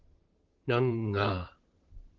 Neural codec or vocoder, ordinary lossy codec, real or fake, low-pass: vocoder, 44.1 kHz, 128 mel bands, Pupu-Vocoder; Opus, 16 kbps; fake; 7.2 kHz